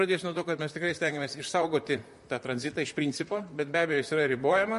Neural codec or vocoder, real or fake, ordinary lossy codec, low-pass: vocoder, 44.1 kHz, 128 mel bands, Pupu-Vocoder; fake; MP3, 48 kbps; 14.4 kHz